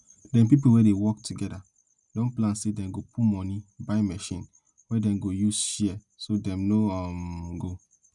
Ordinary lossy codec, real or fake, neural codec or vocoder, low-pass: none; real; none; 10.8 kHz